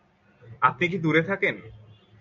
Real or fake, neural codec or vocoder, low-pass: real; none; 7.2 kHz